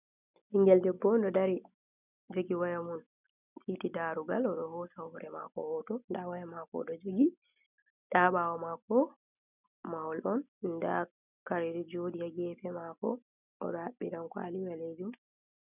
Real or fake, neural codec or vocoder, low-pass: real; none; 3.6 kHz